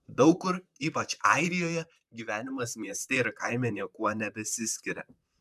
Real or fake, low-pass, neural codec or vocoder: fake; 14.4 kHz; vocoder, 44.1 kHz, 128 mel bands, Pupu-Vocoder